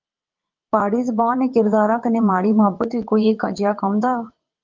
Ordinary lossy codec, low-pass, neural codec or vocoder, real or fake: Opus, 24 kbps; 7.2 kHz; vocoder, 22.05 kHz, 80 mel bands, WaveNeXt; fake